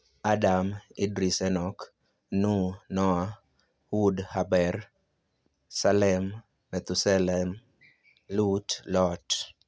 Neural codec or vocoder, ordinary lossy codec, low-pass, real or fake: none; none; none; real